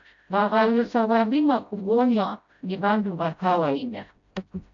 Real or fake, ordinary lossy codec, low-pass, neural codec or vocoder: fake; MP3, 64 kbps; 7.2 kHz; codec, 16 kHz, 0.5 kbps, FreqCodec, smaller model